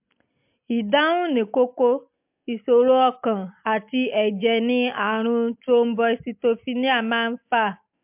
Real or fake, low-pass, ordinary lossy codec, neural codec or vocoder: real; 3.6 kHz; MP3, 32 kbps; none